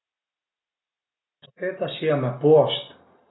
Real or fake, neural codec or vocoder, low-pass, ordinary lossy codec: real; none; 7.2 kHz; AAC, 16 kbps